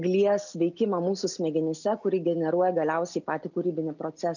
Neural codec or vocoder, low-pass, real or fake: none; 7.2 kHz; real